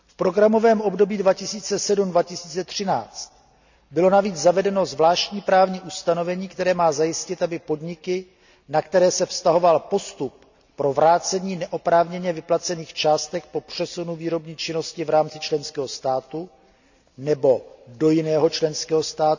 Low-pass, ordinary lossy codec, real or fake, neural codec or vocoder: 7.2 kHz; none; real; none